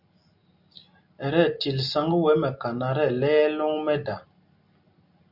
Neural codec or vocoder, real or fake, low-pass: none; real; 5.4 kHz